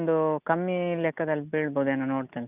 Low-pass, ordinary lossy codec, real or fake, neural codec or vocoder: 3.6 kHz; none; real; none